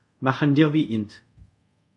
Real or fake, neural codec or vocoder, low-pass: fake; codec, 24 kHz, 0.5 kbps, DualCodec; 10.8 kHz